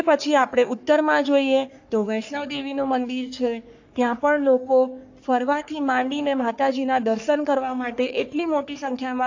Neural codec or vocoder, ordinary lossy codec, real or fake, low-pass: codec, 44.1 kHz, 3.4 kbps, Pupu-Codec; AAC, 48 kbps; fake; 7.2 kHz